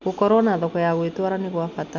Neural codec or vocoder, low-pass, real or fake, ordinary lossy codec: none; 7.2 kHz; real; none